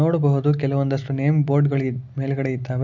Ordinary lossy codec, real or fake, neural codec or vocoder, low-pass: MP3, 64 kbps; real; none; 7.2 kHz